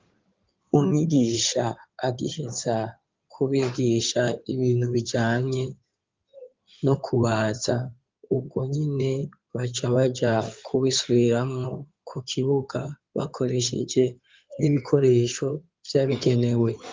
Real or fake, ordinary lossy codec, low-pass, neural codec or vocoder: fake; Opus, 32 kbps; 7.2 kHz; codec, 16 kHz in and 24 kHz out, 2.2 kbps, FireRedTTS-2 codec